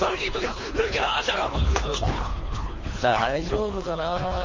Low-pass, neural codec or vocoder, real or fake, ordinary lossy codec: 7.2 kHz; codec, 24 kHz, 3 kbps, HILCodec; fake; MP3, 32 kbps